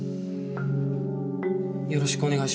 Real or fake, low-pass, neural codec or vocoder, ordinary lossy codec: real; none; none; none